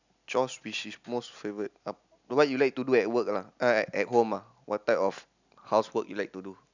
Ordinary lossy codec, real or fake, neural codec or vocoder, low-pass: none; real; none; 7.2 kHz